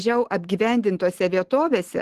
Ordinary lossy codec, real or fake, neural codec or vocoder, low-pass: Opus, 16 kbps; real; none; 14.4 kHz